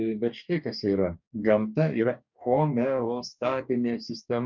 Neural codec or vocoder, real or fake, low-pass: codec, 44.1 kHz, 2.6 kbps, DAC; fake; 7.2 kHz